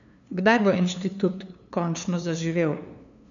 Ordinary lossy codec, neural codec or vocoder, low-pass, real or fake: none; codec, 16 kHz, 2 kbps, FunCodec, trained on LibriTTS, 25 frames a second; 7.2 kHz; fake